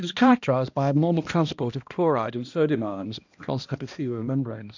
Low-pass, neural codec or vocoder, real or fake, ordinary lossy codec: 7.2 kHz; codec, 16 kHz, 1 kbps, X-Codec, HuBERT features, trained on balanced general audio; fake; AAC, 48 kbps